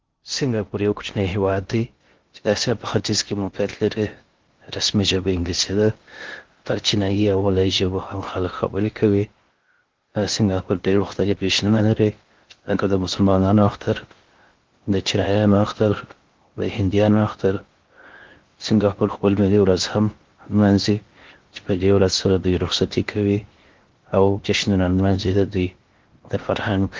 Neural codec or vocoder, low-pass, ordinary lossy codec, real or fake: codec, 16 kHz in and 24 kHz out, 0.6 kbps, FocalCodec, streaming, 2048 codes; 7.2 kHz; Opus, 16 kbps; fake